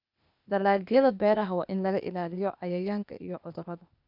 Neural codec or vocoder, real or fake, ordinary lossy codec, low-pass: codec, 16 kHz, 0.8 kbps, ZipCodec; fake; none; 5.4 kHz